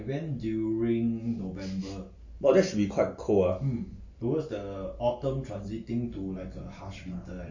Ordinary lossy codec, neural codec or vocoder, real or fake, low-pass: MP3, 32 kbps; none; real; 7.2 kHz